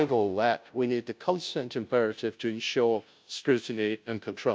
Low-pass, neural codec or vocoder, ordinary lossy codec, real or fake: none; codec, 16 kHz, 0.5 kbps, FunCodec, trained on Chinese and English, 25 frames a second; none; fake